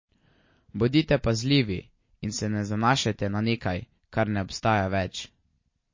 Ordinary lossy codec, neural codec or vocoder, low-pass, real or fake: MP3, 32 kbps; none; 7.2 kHz; real